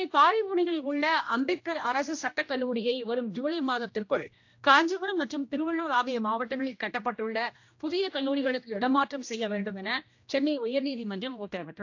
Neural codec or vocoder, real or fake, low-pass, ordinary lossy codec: codec, 16 kHz, 1 kbps, X-Codec, HuBERT features, trained on general audio; fake; 7.2 kHz; AAC, 48 kbps